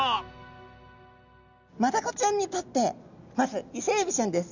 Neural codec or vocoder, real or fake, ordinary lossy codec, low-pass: none; real; none; 7.2 kHz